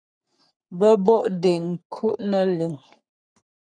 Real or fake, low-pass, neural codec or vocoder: fake; 9.9 kHz; codec, 44.1 kHz, 3.4 kbps, Pupu-Codec